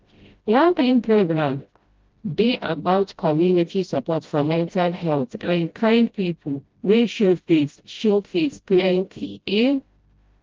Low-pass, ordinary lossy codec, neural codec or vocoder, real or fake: 7.2 kHz; Opus, 24 kbps; codec, 16 kHz, 0.5 kbps, FreqCodec, smaller model; fake